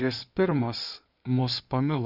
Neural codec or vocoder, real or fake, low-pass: codec, 16 kHz in and 24 kHz out, 2.2 kbps, FireRedTTS-2 codec; fake; 5.4 kHz